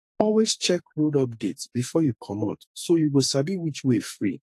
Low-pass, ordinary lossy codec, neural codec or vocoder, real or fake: 14.4 kHz; AAC, 64 kbps; codec, 44.1 kHz, 2.6 kbps, SNAC; fake